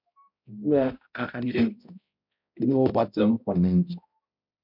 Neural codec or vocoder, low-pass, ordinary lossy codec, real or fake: codec, 16 kHz, 0.5 kbps, X-Codec, HuBERT features, trained on balanced general audio; 5.4 kHz; MP3, 48 kbps; fake